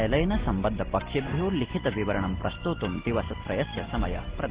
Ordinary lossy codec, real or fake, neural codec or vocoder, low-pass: Opus, 16 kbps; real; none; 3.6 kHz